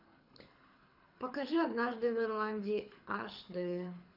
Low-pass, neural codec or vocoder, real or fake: 5.4 kHz; codec, 24 kHz, 6 kbps, HILCodec; fake